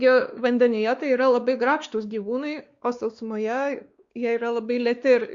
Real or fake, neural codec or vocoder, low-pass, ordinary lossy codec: fake; codec, 16 kHz, 2 kbps, X-Codec, WavLM features, trained on Multilingual LibriSpeech; 7.2 kHz; Opus, 64 kbps